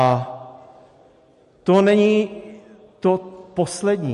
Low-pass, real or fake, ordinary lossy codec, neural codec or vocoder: 14.4 kHz; real; MP3, 48 kbps; none